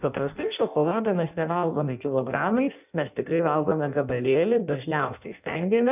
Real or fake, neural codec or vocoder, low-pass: fake; codec, 16 kHz in and 24 kHz out, 0.6 kbps, FireRedTTS-2 codec; 3.6 kHz